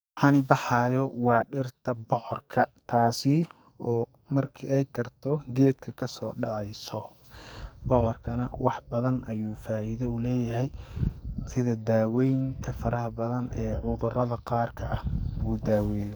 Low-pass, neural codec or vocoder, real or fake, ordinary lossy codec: none; codec, 44.1 kHz, 2.6 kbps, SNAC; fake; none